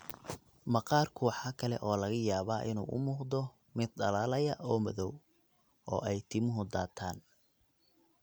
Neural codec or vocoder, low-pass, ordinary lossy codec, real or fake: none; none; none; real